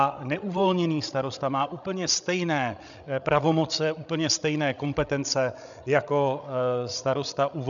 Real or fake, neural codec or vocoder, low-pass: fake; codec, 16 kHz, 16 kbps, FreqCodec, larger model; 7.2 kHz